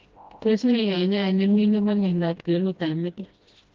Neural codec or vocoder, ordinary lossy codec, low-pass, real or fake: codec, 16 kHz, 1 kbps, FreqCodec, smaller model; Opus, 32 kbps; 7.2 kHz; fake